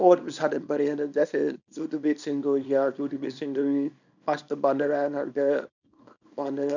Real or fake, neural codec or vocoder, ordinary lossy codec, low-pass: fake; codec, 24 kHz, 0.9 kbps, WavTokenizer, small release; none; 7.2 kHz